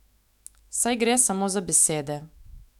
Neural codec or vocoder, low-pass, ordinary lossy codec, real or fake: autoencoder, 48 kHz, 128 numbers a frame, DAC-VAE, trained on Japanese speech; 19.8 kHz; none; fake